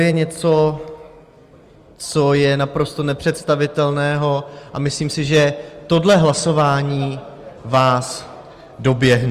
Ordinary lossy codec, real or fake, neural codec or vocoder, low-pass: Opus, 32 kbps; real; none; 14.4 kHz